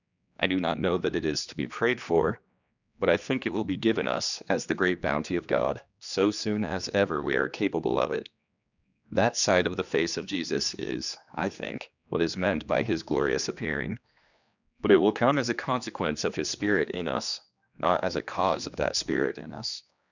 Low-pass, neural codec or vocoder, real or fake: 7.2 kHz; codec, 16 kHz, 2 kbps, X-Codec, HuBERT features, trained on general audio; fake